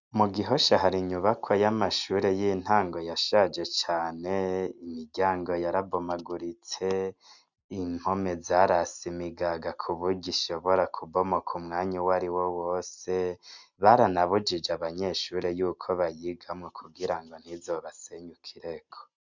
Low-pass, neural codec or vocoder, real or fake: 7.2 kHz; none; real